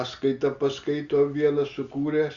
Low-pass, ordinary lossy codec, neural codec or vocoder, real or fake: 7.2 kHz; Opus, 64 kbps; none; real